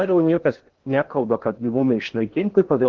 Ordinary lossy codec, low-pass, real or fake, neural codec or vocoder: Opus, 16 kbps; 7.2 kHz; fake; codec, 16 kHz in and 24 kHz out, 0.6 kbps, FocalCodec, streaming, 2048 codes